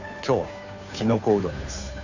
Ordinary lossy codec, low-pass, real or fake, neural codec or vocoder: none; 7.2 kHz; fake; codec, 16 kHz, 2 kbps, FunCodec, trained on Chinese and English, 25 frames a second